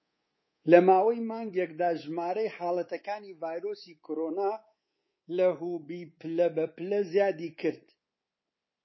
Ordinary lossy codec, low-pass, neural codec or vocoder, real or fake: MP3, 24 kbps; 7.2 kHz; codec, 24 kHz, 3.1 kbps, DualCodec; fake